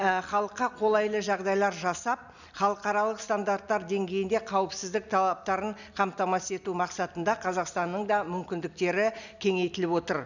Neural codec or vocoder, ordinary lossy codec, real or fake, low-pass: none; none; real; 7.2 kHz